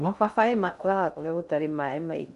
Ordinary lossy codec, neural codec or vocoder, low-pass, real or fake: none; codec, 16 kHz in and 24 kHz out, 0.6 kbps, FocalCodec, streaming, 2048 codes; 10.8 kHz; fake